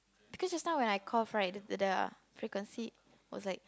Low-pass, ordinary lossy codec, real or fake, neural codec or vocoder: none; none; real; none